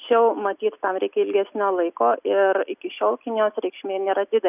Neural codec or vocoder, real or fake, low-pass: none; real; 3.6 kHz